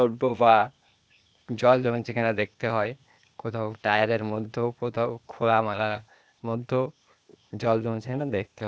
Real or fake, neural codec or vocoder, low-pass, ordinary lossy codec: fake; codec, 16 kHz, 0.8 kbps, ZipCodec; none; none